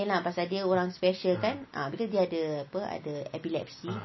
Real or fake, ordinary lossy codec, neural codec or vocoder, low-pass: real; MP3, 24 kbps; none; 7.2 kHz